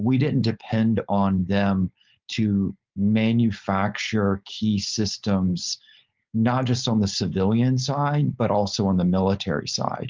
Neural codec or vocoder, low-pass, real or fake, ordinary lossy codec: codec, 16 kHz, 4.8 kbps, FACodec; 7.2 kHz; fake; Opus, 32 kbps